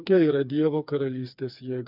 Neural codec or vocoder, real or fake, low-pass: codec, 16 kHz, 4 kbps, FreqCodec, smaller model; fake; 5.4 kHz